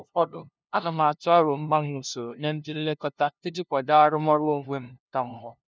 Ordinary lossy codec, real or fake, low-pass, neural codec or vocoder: none; fake; none; codec, 16 kHz, 0.5 kbps, FunCodec, trained on LibriTTS, 25 frames a second